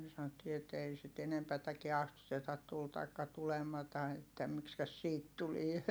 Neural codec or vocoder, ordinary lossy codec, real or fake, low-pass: none; none; real; none